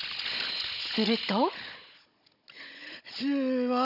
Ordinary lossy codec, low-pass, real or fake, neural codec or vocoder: none; 5.4 kHz; fake; codec, 16 kHz, 16 kbps, FunCodec, trained on Chinese and English, 50 frames a second